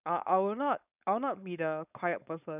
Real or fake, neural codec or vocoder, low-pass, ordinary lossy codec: fake; codec, 16 kHz, 4.8 kbps, FACodec; 3.6 kHz; none